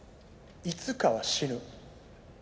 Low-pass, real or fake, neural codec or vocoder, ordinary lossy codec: none; real; none; none